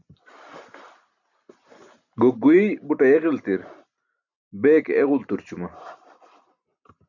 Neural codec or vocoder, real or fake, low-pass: none; real; 7.2 kHz